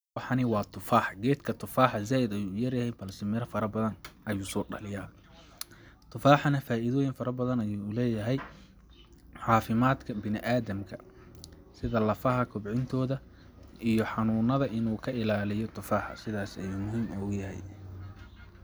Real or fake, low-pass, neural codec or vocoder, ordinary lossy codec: real; none; none; none